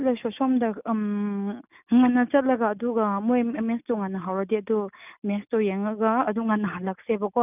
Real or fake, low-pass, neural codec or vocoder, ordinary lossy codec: real; 3.6 kHz; none; none